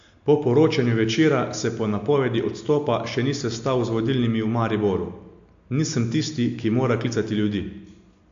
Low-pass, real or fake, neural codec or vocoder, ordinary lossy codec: 7.2 kHz; real; none; AAC, 64 kbps